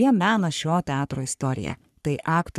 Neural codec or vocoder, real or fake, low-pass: codec, 44.1 kHz, 3.4 kbps, Pupu-Codec; fake; 14.4 kHz